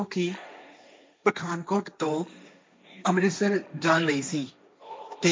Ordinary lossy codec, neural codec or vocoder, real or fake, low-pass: none; codec, 16 kHz, 1.1 kbps, Voila-Tokenizer; fake; none